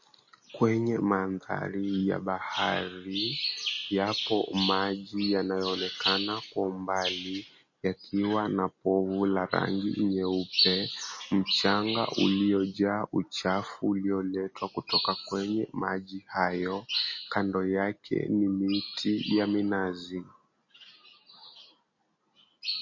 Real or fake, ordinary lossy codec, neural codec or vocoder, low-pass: real; MP3, 32 kbps; none; 7.2 kHz